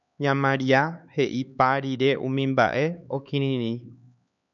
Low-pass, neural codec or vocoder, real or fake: 7.2 kHz; codec, 16 kHz, 4 kbps, X-Codec, HuBERT features, trained on LibriSpeech; fake